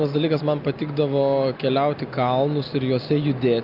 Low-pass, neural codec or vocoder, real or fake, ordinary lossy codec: 5.4 kHz; none; real; Opus, 24 kbps